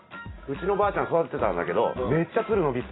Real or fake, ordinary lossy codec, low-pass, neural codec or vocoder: real; AAC, 16 kbps; 7.2 kHz; none